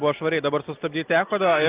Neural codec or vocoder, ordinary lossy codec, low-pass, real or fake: none; Opus, 32 kbps; 3.6 kHz; real